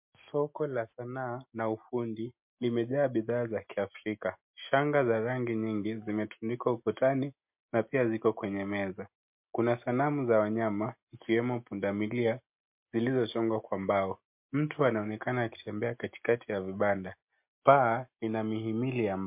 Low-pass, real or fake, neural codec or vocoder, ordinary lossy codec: 3.6 kHz; real; none; MP3, 32 kbps